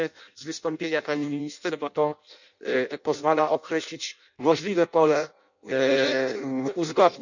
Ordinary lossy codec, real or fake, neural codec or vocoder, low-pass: none; fake; codec, 16 kHz in and 24 kHz out, 0.6 kbps, FireRedTTS-2 codec; 7.2 kHz